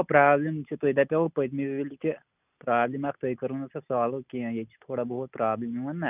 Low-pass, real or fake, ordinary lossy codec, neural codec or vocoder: 3.6 kHz; real; none; none